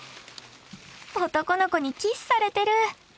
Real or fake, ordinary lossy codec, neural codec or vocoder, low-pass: real; none; none; none